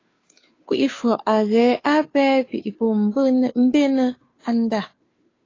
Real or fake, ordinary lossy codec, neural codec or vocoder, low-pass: fake; AAC, 32 kbps; codec, 16 kHz, 2 kbps, FunCodec, trained on Chinese and English, 25 frames a second; 7.2 kHz